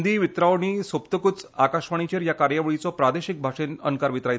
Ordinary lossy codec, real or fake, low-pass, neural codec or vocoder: none; real; none; none